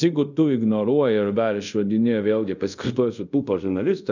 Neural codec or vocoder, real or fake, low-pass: codec, 24 kHz, 0.5 kbps, DualCodec; fake; 7.2 kHz